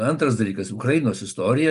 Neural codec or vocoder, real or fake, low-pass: none; real; 10.8 kHz